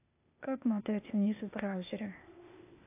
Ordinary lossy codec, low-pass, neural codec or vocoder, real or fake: AAC, 24 kbps; 3.6 kHz; codec, 16 kHz, 0.8 kbps, ZipCodec; fake